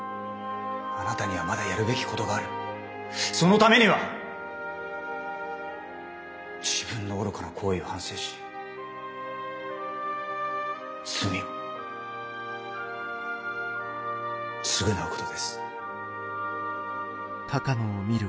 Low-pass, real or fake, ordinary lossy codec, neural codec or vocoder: none; real; none; none